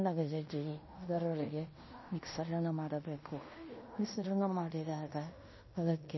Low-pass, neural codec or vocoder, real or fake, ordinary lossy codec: 7.2 kHz; codec, 16 kHz in and 24 kHz out, 0.9 kbps, LongCat-Audio-Codec, fine tuned four codebook decoder; fake; MP3, 24 kbps